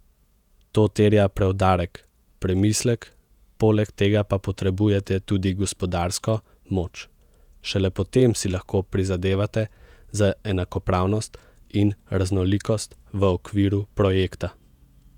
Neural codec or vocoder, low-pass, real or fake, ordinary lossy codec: vocoder, 48 kHz, 128 mel bands, Vocos; 19.8 kHz; fake; none